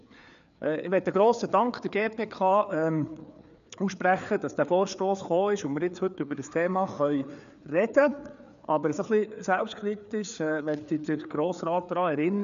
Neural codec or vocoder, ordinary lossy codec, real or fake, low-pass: codec, 16 kHz, 4 kbps, FreqCodec, larger model; none; fake; 7.2 kHz